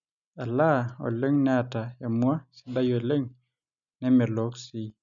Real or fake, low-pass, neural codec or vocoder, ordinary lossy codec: real; 7.2 kHz; none; none